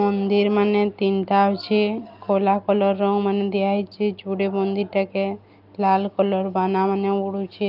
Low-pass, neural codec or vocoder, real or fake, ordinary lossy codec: 5.4 kHz; none; real; Opus, 24 kbps